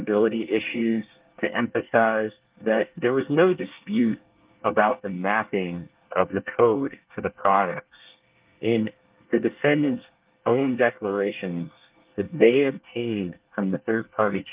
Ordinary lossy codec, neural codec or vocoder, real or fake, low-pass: Opus, 32 kbps; codec, 24 kHz, 1 kbps, SNAC; fake; 3.6 kHz